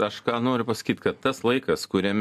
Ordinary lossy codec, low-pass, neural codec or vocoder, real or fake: AAC, 96 kbps; 14.4 kHz; none; real